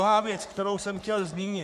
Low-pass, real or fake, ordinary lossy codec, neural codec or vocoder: 14.4 kHz; fake; MP3, 96 kbps; codec, 44.1 kHz, 3.4 kbps, Pupu-Codec